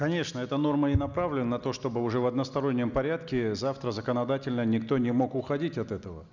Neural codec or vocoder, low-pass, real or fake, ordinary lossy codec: none; 7.2 kHz; real; none